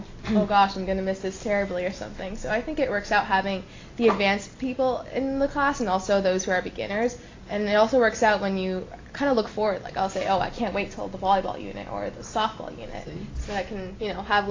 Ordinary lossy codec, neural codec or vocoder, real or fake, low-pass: AAC, 32 kbps; none; real; 7.2 kHz